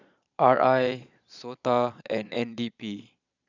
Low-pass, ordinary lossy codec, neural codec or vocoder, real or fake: 7.2 kHz; none; vocoder, 22.05 kHz, 80 mel bands, Vocos; fake